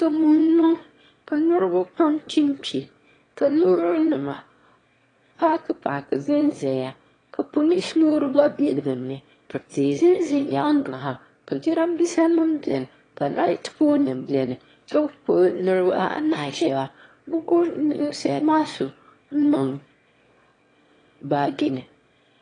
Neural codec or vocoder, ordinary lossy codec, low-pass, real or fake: autoencoder, 22.05 kHz, a latent of 192 numbers a frame, VITS, trained on one speaker; AAC, 32 kbps; 9.9 kHz; fake